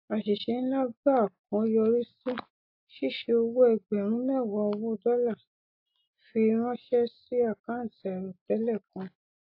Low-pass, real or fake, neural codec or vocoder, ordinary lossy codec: 5.4 kHz; real; none; AAC, 48 kbps